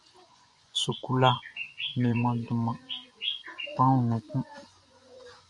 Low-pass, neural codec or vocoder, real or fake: 10.8 kHz; vocoder, 24 kHz, 100 mel bands, Vocos; fake